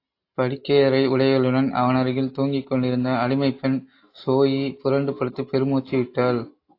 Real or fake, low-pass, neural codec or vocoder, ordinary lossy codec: real; 5.4 kHz; none; AAC, 32 kbps